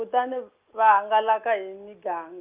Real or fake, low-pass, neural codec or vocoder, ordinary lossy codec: real; 3.6 kHz; none; Opus, 32 kbps